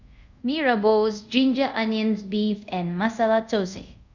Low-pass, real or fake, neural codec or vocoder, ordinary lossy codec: 7.2 kHz; fake; codec, 24 kHz, 0.5 kbps, DualCodec; none